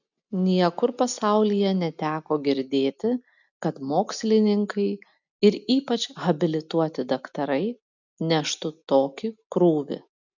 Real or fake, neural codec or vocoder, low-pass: real; none; 7.2 kHz